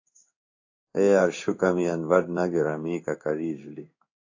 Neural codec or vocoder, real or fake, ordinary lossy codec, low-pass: codec, 16 kHz in and 24 kHz out, 1 kbps, XY-Tokenizer; fake; AAC, 48 kbps; 7.2 kHz